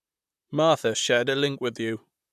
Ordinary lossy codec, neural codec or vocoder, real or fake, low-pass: none; vocoder, 44.1 kHz, 128 mel bands, Pupu-Vocoder; fake; 14.4 kHz